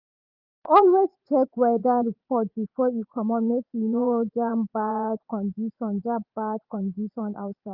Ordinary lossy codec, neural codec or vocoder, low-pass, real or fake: Opus, 24 kbps; vocoder, 44.1 kHz, 80 mel bands, Vocos; 5.4 kHz; fake